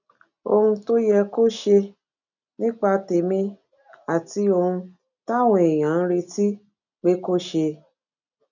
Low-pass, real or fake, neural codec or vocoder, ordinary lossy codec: 7.2 kHz; real; none; none